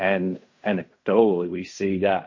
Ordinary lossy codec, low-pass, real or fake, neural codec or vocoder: MP3, 32 kbps; 7.2 kHz; fake; codec, 16 kHz in and 24 kHz out, 0.4 kbps, LongCat-Audio-Codec, fine tuned four codebook decoder